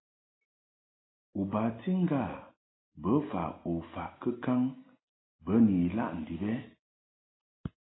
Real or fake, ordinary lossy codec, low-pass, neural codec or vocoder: real; AAC, 16 kbps; 7.2 kHz; none